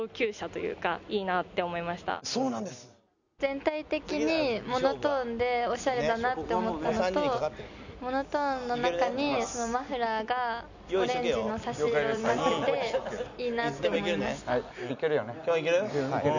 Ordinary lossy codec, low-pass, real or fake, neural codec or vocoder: none; 7.2 kHz; real; none